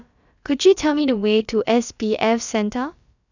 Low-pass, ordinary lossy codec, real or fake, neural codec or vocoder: 7.2 kHz; none; fake; codec, 16 kHz, about 1 kbps, DyCAST, with the encoder's durations